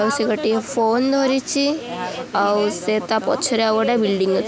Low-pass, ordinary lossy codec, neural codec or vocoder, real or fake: none; none; none; real